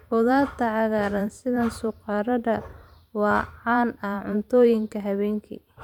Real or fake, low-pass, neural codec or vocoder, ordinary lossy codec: fake; 19.8 kHz; vocoder, 44.1 kHz, 128 mel bands every 512 samples, BigVGAN v2; none